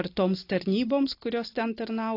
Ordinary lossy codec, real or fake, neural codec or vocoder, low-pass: AAC, 32 kbps; real; none; 5.4 kHz